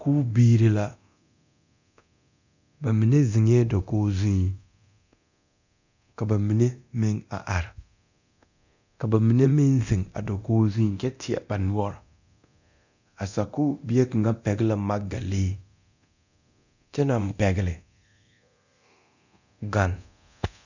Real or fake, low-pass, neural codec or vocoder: fake; 7.2 kHz; codec, 24 kHz, 0.9 kbps, DualCodec